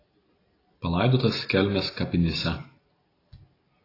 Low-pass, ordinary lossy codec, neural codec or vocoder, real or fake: 5.4 kHz; AAC, 24 kbps; none; real